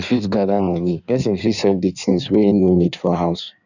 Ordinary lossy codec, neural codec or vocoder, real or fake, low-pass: none; codec, 16 kHz in and 24 kHz out, 1.1 kbps, FireRedTTS-2 codec; fake; 7.2 kHz